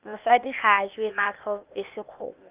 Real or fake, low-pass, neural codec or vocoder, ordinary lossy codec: fake; 3.6 kHz; codec, 16 kHz, 0.8 kbps, ZipCodec; Opus, 24 kbps